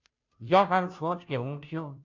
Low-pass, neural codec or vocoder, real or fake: 7.2 kHz; codec, 16 kHz, 0.5 kbps, FunCodec, trained on Chinese and English, 25 frames a second; fake